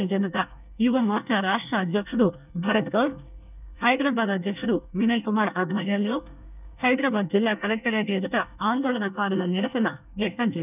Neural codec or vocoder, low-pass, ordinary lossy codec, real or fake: codec, 24 kHz, 1 kbps, SNAC; 3.6 kHz; none; fake